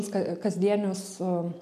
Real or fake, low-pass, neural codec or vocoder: real; 14.4 kHz; none